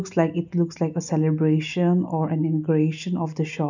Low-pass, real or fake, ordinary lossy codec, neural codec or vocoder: 7.2 kHz; real; none; none